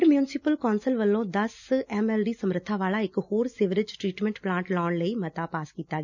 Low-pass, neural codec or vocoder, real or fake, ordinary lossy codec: 7.2 kHz; none; real; none